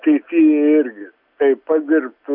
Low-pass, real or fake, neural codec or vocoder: 5.4 kHz; real; none